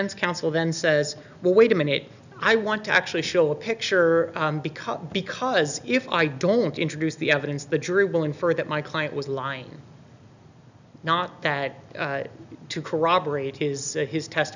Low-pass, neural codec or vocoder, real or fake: 7.2 kHz; none; real